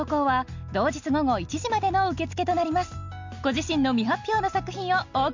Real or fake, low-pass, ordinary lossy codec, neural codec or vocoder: real; 7.2 kHz; MP3, 48 kbps; none